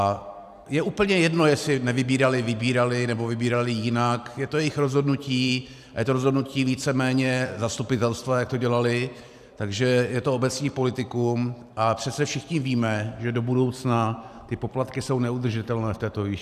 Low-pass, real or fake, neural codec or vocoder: 14.4 kHz; fake; vocoder, 44.1 kHz, 128 mel bands every 512 samples, BigVGAN v2